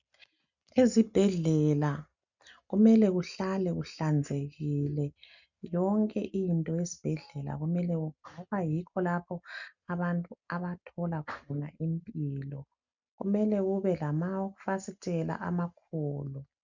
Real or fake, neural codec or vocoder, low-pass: real; none; 7.2 kHz